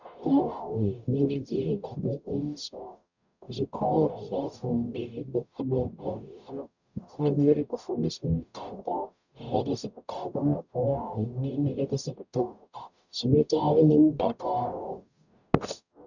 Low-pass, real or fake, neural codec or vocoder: 7.2 kHz; fake; codec, 44.1 kHz, 0.9 kbps, DAC